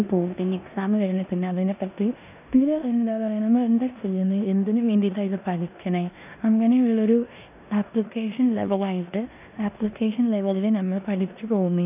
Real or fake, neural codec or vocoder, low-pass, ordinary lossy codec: fake; codec, 16 kHz in and 24 kHz out, 0.9 kbps, LongCat-Audio-Codec, four codebook decoder; 3.6 kHz; none